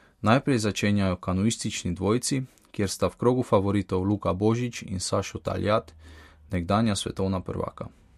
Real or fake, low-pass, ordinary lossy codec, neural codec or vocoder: real; 14.4 kHz; MP3, 64 kbps; none